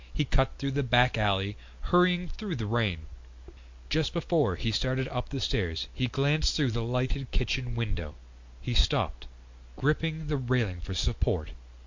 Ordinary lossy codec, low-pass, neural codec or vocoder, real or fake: MP3, 48 kbps; 7.2 kHz; none; real